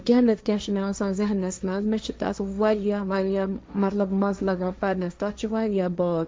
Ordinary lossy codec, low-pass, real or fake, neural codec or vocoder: none; none; fake; codec, 16 kHz, 1.1 kbps, Voila-Tokenizer